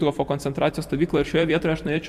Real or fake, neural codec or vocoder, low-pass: fake; vocoder, 44.1 kHz, 128 mel bands every 256 samples, BigVGAN v2; 14.4 kHz